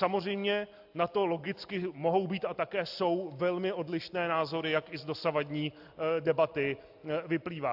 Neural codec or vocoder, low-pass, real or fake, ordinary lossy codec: none; 5.4 kHz; real; AAC, 48 kbps